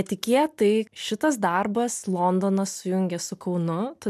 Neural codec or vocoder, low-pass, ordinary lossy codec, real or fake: none; 14.4 kHz; MP3, 96 kbps; real